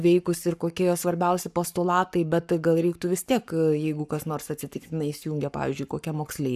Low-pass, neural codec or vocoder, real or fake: 14.4 kHz; codec, 44.1 kHz, 7.8 kbps, Pupu-Codec; fake